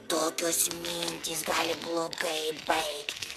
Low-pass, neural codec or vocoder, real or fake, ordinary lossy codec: 14.4 kHz; codec, 44.1 kHz, 2.6 kbps, SNAC; fake; MP3, 96 kbps